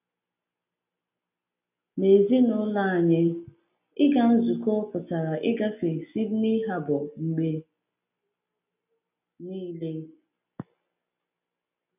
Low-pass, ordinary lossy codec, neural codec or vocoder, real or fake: 3.6 kHz; none; none; real